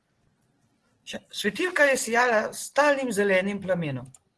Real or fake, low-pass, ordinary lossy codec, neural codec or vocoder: real; 10.8 kHz; Opus, 16 kbps; none